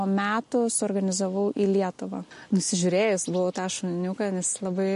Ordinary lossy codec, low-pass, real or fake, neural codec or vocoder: MP3, 48 kbps; 14.4 kHz; real; none